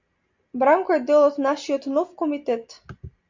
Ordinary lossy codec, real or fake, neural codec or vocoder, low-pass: MP3, 64 kbps; real; none; 7.2 kHz